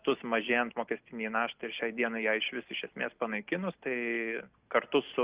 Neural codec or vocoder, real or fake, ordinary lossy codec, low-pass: none; real; Opus, 32 kbps; 3.6 kHz